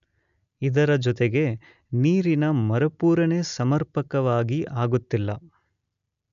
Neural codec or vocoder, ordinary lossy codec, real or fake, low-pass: none; none; real; 7.2 kHz